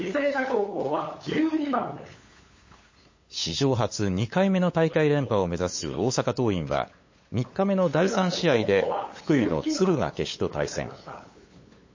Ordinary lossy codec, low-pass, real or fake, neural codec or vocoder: MP3, 32 kbps; 7.2 kHz; fake; codec, 16 kHz, 8 kbps, FunCodec, trained on LibriTTS, 25 frames a second